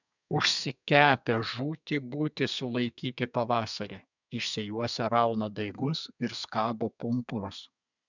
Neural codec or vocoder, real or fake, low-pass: codec, 32 kHz, 1.9 kbps, SNAC; fake; 7.2 kHz